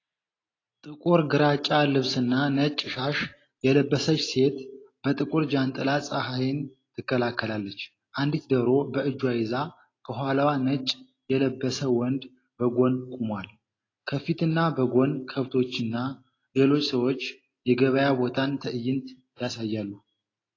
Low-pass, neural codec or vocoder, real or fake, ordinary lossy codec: 7.2 kHz; none; real; AAC, 32 kbps